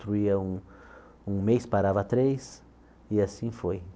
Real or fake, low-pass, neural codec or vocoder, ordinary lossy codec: real; none; none; none